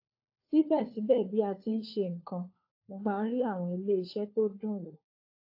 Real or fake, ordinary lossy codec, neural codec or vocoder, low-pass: fake; AAC, 32 kbps; codec, 16 kHz, 4 kbps, FunCodec, trained on LibriTTS, 50 frames a second; 5.4 kHz